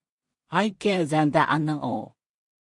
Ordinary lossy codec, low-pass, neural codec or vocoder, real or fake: MP3, 48 kbps; 10.8 kHz; codec, 16 kHz in and 24 kHz out, 0.4 kbps, LongCat-Audio-Codec, two codebook decoder; fake